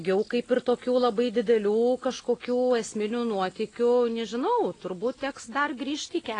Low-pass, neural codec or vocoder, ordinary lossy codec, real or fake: 9.9 kHz; none; AAC, 32 kbps; real